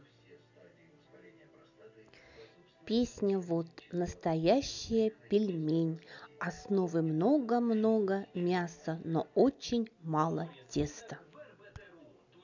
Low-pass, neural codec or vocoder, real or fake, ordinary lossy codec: 7.2 kHz; none; real; none